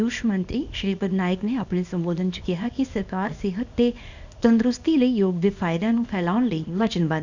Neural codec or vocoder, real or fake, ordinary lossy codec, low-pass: codec, 24 kHz, 0.9 kbps, WavTokenizer, small release; fake; none; 7.2 kHz